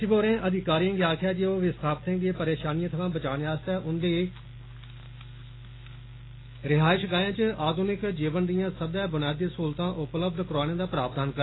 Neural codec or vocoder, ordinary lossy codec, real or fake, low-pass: none; AAC, 16 kbps; real; 7.2 kHz